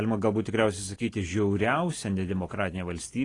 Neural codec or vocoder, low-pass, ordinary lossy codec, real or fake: none; 10.8 kHz; AAC, 32 kbps; real